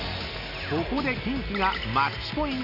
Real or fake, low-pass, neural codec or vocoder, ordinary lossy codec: real; 5.4 kHz; none; none